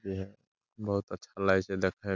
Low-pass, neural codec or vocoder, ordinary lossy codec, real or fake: 7.2 kHz; none; none; real